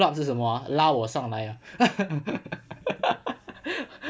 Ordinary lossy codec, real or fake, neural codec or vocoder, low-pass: none; real; none; none